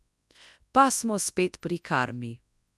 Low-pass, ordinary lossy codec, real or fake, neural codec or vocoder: none; none; fake; codec, 24 kHz, 0.9 kbps, WavTokenizer, large speech release